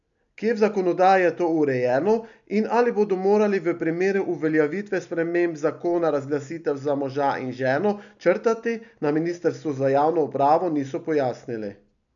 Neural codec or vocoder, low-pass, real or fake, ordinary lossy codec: none; 7.2 kHz; real; none